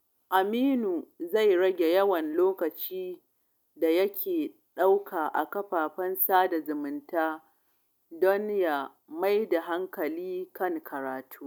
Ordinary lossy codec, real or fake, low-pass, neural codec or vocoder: none; real; 19.8 kHz; none